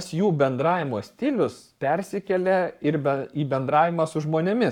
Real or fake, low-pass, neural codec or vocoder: fake; 19.8 kHz; codec, 44.1 kHz, 7.8 kbps, Pupu-Codec